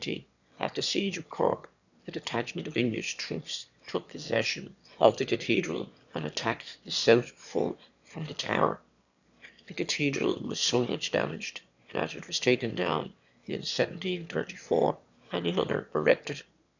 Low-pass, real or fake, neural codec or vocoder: 7.2 kHz; fake; autoencoder, 22.05 kHz, a latent of 192 numbers a frame, VITS, trained on one speaker